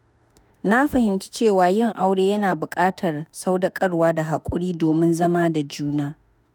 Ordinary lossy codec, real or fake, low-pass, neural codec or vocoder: none; fake; none; autoencoder, 48 kHz, 32 numbers a frame, DAC-VAE, trained on Japanese speech